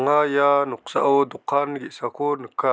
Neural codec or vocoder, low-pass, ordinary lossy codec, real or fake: none; none; none; real